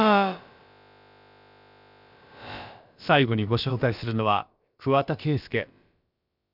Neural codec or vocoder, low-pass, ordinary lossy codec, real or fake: codec, 16 kHz, about 1 kbps, DyCAST, with the encoder's durations; 5.4 kHz; none; fake